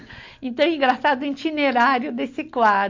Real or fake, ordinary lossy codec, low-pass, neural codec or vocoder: real; MP3, 48 kbps; 7.2 kHz; none